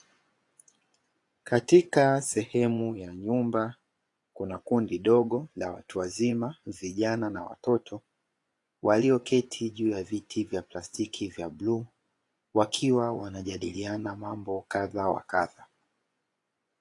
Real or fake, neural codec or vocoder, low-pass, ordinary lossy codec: fake; vocoder, 24 kHz, 100 mel bands, Vocos; 10.8 kHz; AAC, 48 kbps